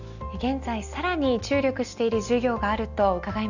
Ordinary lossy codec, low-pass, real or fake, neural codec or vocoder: none; 7.2 kHz; real; none